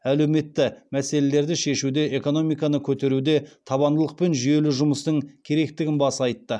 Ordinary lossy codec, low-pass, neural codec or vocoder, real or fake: none; none; none; real